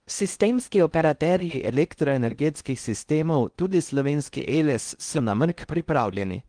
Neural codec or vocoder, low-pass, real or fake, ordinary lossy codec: codec, 16 kHz in and 24 kHz out, 0.6 kbps, FocalCodec, streaming, 4096 codes; 9.9 kHz; fake; Opus, 32 kbps